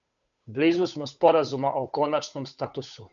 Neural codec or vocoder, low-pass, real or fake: codec, 16 kHz, 8 kbps, FunCodec, trained on Chinese and English, 25 frames a second; 7.2 kHz; fake